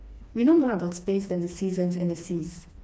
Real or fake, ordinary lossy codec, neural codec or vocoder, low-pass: fake; none; codec, 16 kHz, 2 kbps, FreqCodec, smaller model; none